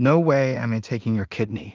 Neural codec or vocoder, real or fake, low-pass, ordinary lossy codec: codec, 16 kHz in and 24 kHz out, 0.4 kbps, LongCat-Audio-Codec, two codebook decoder; fake; 7.2 kHz; Opus, 24 kbps